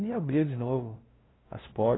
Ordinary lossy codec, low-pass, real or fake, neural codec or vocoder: AAC, 16 kbps; 7.2 kHz; fake; codec, 16 kHz, 0.5 kbps, FunCodec, trained on LibriTTS, 25 frames a second